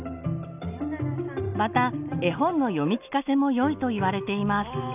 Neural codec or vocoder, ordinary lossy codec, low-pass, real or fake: none; none; 3.6 kHz; real